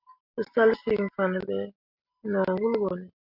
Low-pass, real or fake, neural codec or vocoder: 5.4 kHz; real; none